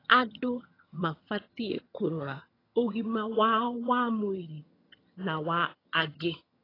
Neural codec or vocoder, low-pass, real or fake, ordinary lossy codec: vocoder, 22.05 kHz, 80 mel bands, HiFi-GAN; 5.4 kHz; fake; AAC, 24 kbps